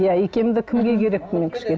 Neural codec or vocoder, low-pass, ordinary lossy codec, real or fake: none; none; none; real